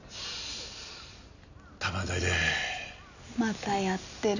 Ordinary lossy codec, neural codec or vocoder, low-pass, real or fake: none; none; 7.2 kHz; real